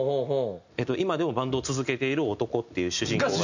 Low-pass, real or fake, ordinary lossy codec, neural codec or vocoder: 7.2 kHz; real; none; none